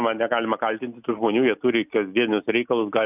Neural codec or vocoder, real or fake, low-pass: none; real; 3.6 kHz